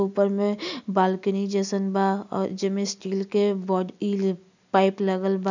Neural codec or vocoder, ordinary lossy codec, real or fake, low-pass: none; none; real; 7.2 kHz